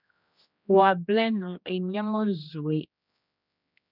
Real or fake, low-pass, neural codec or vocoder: fake; 5.4 kHz; codec, 16 kHz, 1 kbps, X-Codec, HuBERT features, trained on general audio